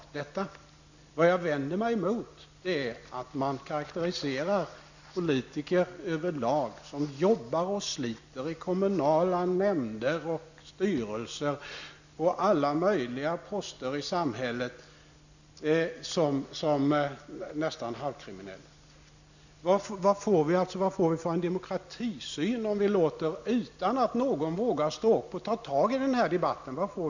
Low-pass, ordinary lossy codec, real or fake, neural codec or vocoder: 7.2 kHz; none; real; none